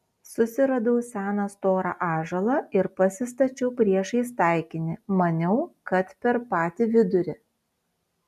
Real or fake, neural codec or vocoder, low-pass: real; none; 14.4 kHz